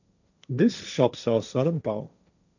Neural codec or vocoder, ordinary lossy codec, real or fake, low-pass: codec, 16 kHz, 1.1 kbps, Voila-Tokenizer; none; fake; 7.2 kHz